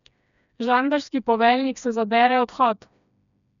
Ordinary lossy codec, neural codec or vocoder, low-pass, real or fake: none; codec, 16 kHz, 2 kbps, FreqCodec, smaller model; 7.2 kHz; fake